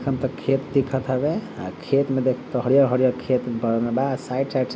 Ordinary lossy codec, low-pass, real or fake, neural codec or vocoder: none; none; real; none